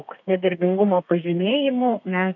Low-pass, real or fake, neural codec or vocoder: 7.2 kHz; fake; codec, 32 kHz, 1.9 kbps, SNAC